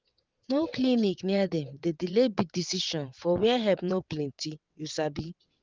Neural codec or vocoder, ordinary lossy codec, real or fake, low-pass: vocoder, 22.05 kHz, 80 mel bands, WaveNeXt; Opus, 32 kbps; fake; 7.2 kHz